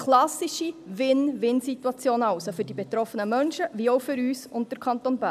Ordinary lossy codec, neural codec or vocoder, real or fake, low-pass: none; none; real; 14.4 kHz